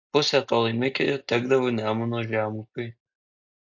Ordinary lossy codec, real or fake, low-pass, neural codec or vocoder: AAC, 48 kbps; real; 7.2 kHz; none